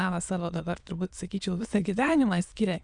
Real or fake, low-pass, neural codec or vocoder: fake; 9.9 kHz; autoencoder, 22.05 kHz, a latent of 192 numbers a frame, VITS, trained on many speakers